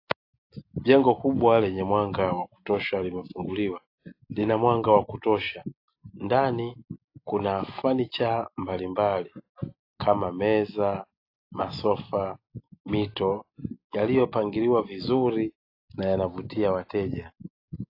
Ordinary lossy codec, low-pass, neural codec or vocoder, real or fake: AAC, 32 kbps; 5.4 kHz; none; real